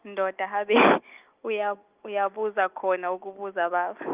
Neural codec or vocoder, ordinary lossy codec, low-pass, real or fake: none; Opus, 24 kbps; 3.6 kHz; real